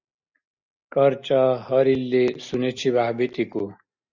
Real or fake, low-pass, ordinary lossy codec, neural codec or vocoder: real; 7.2 kHz; Opus, 64 kbps; none